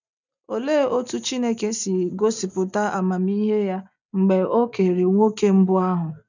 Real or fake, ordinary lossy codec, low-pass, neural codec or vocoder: real; none; 7.2 kHz; none